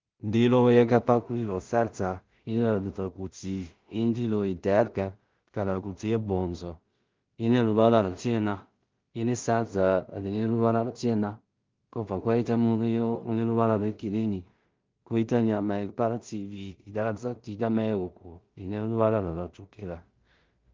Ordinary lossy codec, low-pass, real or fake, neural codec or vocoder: Opus, 24 kbps; 7.2 kHz; fake; codec, 16 kHz in and 24 kHz out, 0.4 kbps, LongCat-Audio-Codec, two codebook decoder